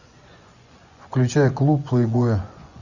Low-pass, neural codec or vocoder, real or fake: 7.2 kHz; vocoder, 24 kHz, 100 mel bands, Vocos; fake